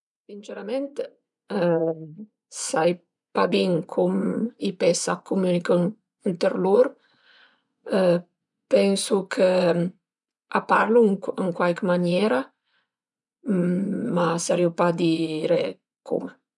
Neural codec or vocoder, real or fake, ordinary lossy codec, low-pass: vocoder, 24 kHz, 100 mel bands, Vocos; fake; none; 10.8 kHz